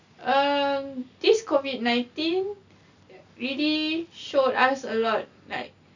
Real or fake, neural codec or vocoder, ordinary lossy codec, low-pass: real; none; none; 7.2 kHz